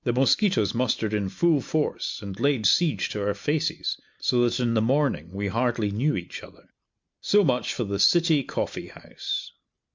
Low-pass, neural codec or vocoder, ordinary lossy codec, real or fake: 7.2 kHz; none; MP3, 64 kbps; real